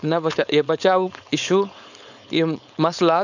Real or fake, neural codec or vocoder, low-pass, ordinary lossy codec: fake; codec, 16 kHz, 4.8 kbps, FACodec; 7.2 kHz; none